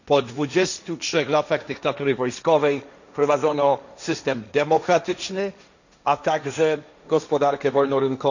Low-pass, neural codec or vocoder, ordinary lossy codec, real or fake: 7.2 kHz; codec, 16 kHz, 1.1 kbps, Voila-Tokenizer; none; fake